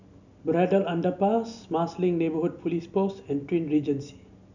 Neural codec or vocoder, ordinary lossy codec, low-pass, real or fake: none; none; 7.2 kHz; real